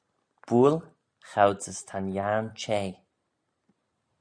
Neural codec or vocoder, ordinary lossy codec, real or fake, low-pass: none; MP3, 64 kbps; real; 9.9 kHz